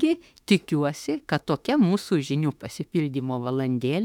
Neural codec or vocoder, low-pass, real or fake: autoencoder, 48 kHz, 32 numbers a frame, DAC-VAE, trained on Japanese speech; 19.8 kHz; fake